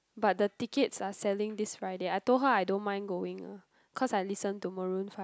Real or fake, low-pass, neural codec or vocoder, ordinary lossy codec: real; none; none; none